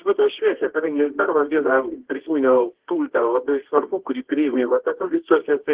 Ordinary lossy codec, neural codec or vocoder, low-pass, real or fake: Opus, 16 kbps; codec, 24 kHz, 0.9 kbps, WavTokenizer, medium music audio release; 3.6 kHz; fake